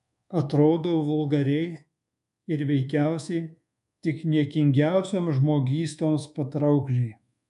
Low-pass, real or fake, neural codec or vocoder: 10.8 kHz; fake; codec, 24 kHz, 1.2 kbps, DualCodec